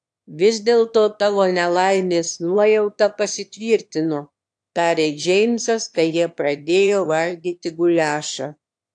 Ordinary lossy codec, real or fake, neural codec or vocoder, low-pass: AAC, 64 kbps; fake; autoencoder, 22.05 kHz, a latent of 192 numbers a frame, VITS, trained on one speaker; 9.9 kHz